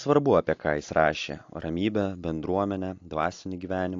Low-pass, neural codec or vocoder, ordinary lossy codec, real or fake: 7.2 kHz; none; AAC, 48 kbps; real